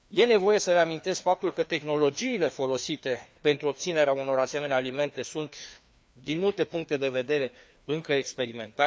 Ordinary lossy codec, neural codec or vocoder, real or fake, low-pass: none; codec, 16 kHz, 2 kbps, FreqCodec, larger model; fake; none